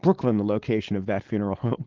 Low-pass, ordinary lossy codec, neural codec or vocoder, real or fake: 7.2 kHz; Opus, 16 kbps; codec, 16 kHz, 4.8 kbps, FACodec; fake